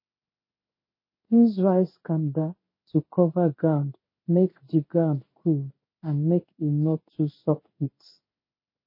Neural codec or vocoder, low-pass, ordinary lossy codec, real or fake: codec, 16 kHz in and 24 kHz out, 1 kbps, XY-Tokenizer; 5.4 kHz; MP3, 24 kbps; fake